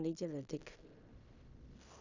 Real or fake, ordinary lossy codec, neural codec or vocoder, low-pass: fake; Opus, 64 kbps; codec, 16 kHz in and 24 kHz out, 0.4 kbps, LongCat-Audio-Codec, fine tuned four codebook decoder; 7.2 kHz